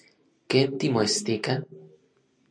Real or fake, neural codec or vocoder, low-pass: real; none; 9.9 kHz